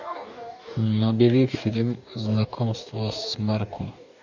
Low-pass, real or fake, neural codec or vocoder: 7.2 kHz; fake; codec, 44.1 kHz, 2.6 kbps, DAC